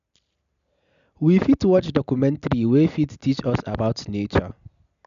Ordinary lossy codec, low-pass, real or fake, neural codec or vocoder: none; 7.2 kHz; real; none